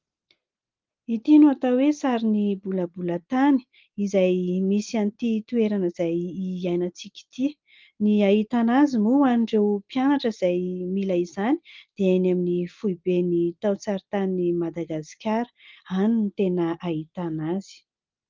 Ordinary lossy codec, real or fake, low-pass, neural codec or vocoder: Opus, 32 kbps; real; 7.2 kHz; none